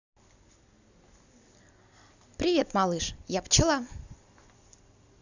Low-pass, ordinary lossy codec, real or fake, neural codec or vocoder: 7.2 kHz; none; real; none